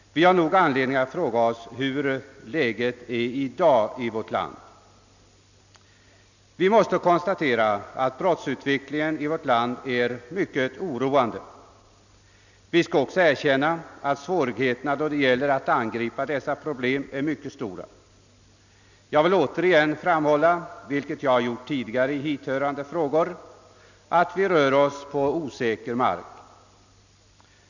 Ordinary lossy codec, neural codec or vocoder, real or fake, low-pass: none; none; real; 7.2 kHz